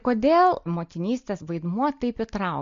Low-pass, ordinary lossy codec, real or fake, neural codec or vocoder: 7.2 kHz; MP3, 48 kbps; real; none